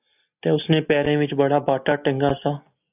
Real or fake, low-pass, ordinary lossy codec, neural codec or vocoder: real; 3.6 kHz; AAC, 32 kbps; none